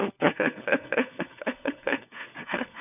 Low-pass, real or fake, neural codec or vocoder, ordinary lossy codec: 3.6 kHz; fake; codec, 16 kHz, 4 kbps, FunCodec, trained on LibriTTS, 50 frames a second; none